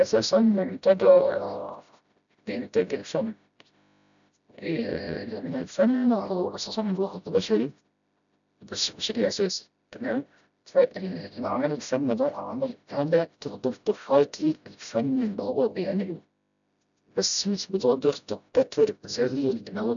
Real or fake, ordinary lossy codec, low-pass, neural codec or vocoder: fake; none; 7.2 kHz; codec, 16 kHz, 0.5 kbps, FreqCodec, smaller model